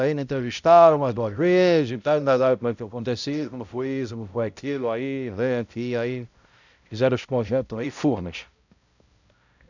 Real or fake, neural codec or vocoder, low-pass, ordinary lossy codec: fake; codec, 16 kHz, 0.5 kbps, X-Codec, HuBERT features, trained on balanced general audio; 7.2 kHz; none